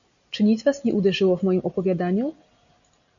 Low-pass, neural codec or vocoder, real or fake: 7.2 kHz; none; real